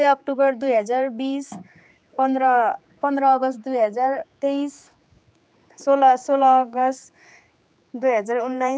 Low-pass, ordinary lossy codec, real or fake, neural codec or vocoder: none; none; fake; codec, 16 kHz, 4 kbps, X-Codec, HuBERT features, trained on general audio